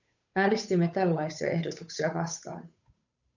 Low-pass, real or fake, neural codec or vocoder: 7.2 kHz; fake; codec, 16 kHz, 8 kbps, FunCodec, trained on Chinese and English, 25 frames a second